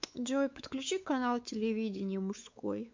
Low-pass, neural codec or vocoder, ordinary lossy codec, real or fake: 7.2 kHz; codec, 16 kHz, 4 kbps, X-Codec, WavLM features, trained on Multilingual LibriSpeech; MP3, 64 kbps; fake